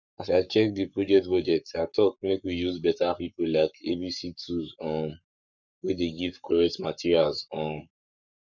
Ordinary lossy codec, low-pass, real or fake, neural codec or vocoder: none; 7.2 kHz; fake; codec, 44.1 kHz, 7.8 kbps, Pupu-Codec